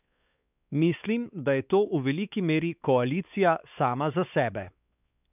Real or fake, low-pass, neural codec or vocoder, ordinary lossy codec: fake; 3.6 kHz; codec, 16 kHz, 4 kbps, X-Codec, WavLM features, trained on Multilingual LibriSpeech; none